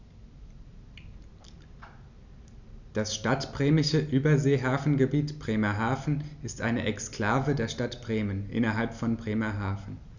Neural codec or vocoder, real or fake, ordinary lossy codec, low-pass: none; real; none; 7.2 kHz